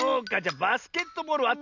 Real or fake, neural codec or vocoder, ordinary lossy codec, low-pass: real; none; none; 7.2 kHz